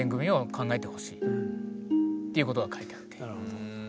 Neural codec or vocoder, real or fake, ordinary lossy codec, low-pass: none; real; none; none